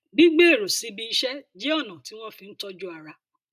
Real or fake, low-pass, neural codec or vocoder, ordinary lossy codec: real; 14.4 kHz; none; AAC, 96 kbps